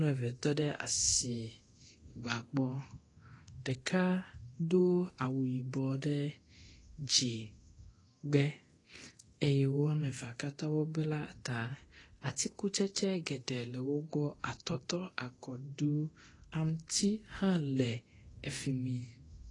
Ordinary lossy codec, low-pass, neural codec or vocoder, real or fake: AAC, 32 kbps; 10.8 kHz; codec, 24 kHz, 0.9 kbps, DualCodec; fake